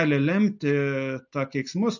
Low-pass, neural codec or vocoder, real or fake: 7.2 kHz; none; real